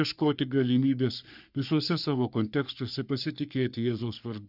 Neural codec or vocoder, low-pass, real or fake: codec, 44.1 kHz, 3.4 kbps, Pupu-Codec; 5.4 kHz; fake